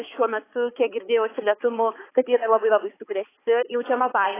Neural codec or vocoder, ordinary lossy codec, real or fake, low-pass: codec, 16 kHz, 4 kbps, X-Codec, HuBERT features, trained on balanced general audio; AAC, 16 kbps; fake; 3.6 kHz